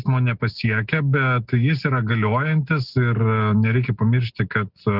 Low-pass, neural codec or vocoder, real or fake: 5.4 kHz; none; real